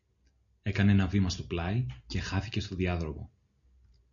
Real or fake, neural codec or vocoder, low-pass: real; none; 7.2 kHz